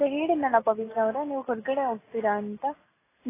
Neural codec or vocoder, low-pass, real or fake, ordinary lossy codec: none; 3.6 kHz; real; AAC, 16 kbps